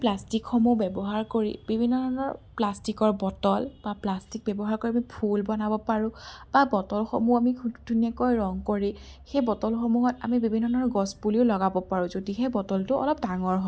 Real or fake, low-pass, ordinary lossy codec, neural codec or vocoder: real; none; none; none